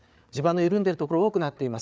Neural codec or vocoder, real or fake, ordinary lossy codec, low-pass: codec, 16 kHz, 8 kbps, FreqCodec, larger model; fake; none; none